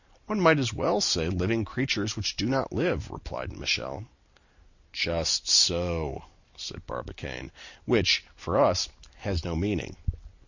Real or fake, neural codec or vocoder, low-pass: real; none; 7.2 kHz